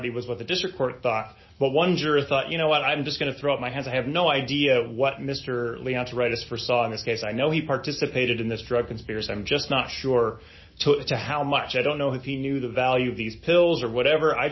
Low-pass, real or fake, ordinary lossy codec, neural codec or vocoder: 7.2 kHz; real; MP3, 24 kbps; none